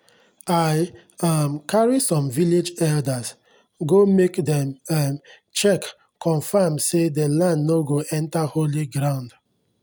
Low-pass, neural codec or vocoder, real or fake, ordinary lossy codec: none; none; real; none